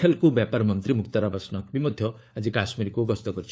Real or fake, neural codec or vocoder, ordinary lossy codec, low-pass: fake; codec, 16 kHz, 4 kbps, FunCodec, trained on LibriTTS, 50 frames a second; none; none